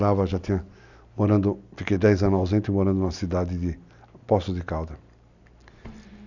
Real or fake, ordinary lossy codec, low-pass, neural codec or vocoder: real; none; 7.2 kHz; none